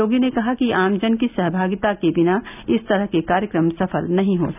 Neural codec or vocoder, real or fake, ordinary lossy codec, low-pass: none; real; none; 3.6 kHz